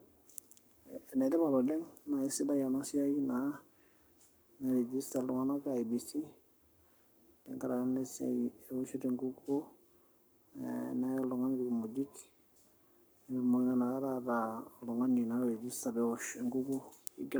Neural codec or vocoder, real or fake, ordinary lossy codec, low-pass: codec, 44.1 kHz, 7.8 kbps, Pupu-Codec; fake; none; none